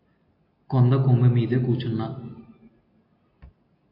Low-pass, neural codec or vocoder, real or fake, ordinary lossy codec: 5.4 kHz; none; real; AAC, 32 kbps